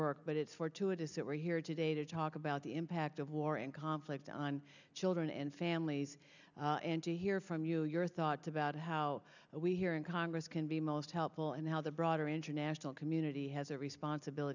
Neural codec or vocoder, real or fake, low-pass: none; real; 7.2 kHz